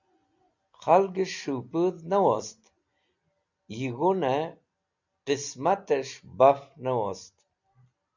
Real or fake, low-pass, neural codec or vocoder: real; 7.2 kHz; none